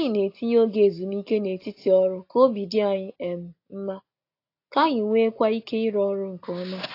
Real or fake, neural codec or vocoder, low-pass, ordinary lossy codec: real; none; 5.4 kHz; AAC, 32 kbps